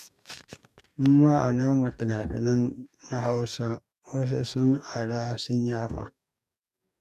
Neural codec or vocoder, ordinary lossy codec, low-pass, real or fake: codec, 44.1 kHz, 2.6 kbps, DAC; none; 14.4 kHz; fake